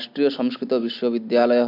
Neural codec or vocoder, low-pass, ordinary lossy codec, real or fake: none; 5.4 kHz; AAC, 32 kbps; real